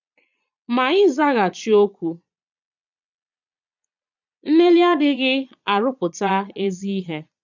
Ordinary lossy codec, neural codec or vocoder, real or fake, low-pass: none; vocoder, 22.05 kHz, 80 mel bands, Vocos; fake; 7.2 kHz